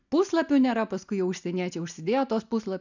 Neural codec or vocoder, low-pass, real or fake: none; 7.2 kHz; real